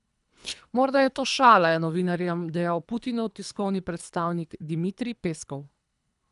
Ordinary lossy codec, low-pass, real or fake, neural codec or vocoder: none; 10.8 kHz; fake; codec, 24 kHz, 3 kbps, HILCodec